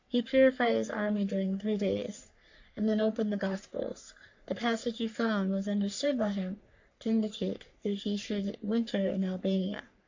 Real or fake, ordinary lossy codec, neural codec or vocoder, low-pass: fake; AAC, 48 kbps; codec, 44.1 kHz, 3.4 kbps, Pupu-Codec; 7.2 kHz